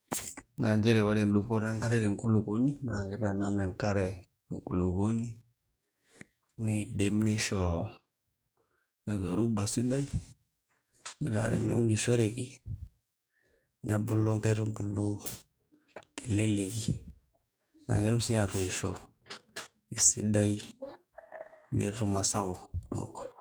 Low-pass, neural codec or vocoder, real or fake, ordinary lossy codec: none; codec, 44.1 kHz, 2.6 kbps, DAC; fake; none